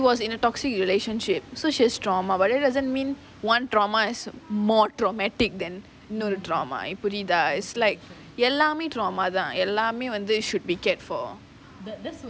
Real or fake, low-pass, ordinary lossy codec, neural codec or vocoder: real; none; none; none